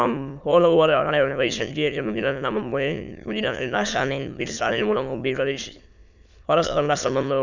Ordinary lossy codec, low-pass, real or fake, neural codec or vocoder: none; 7.2 kHz; fake; autoencoder, 22.05 kHz, a latent of 192 numbers a frame, VITS, trained on many speakers